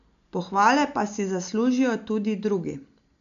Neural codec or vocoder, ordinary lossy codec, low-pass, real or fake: none; none; 7.2 kHz; real